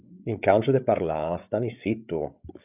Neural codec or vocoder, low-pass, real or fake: codec, 16 kHz, 8 kbps, FreqCodec, larger model; 3.6 kHz; fake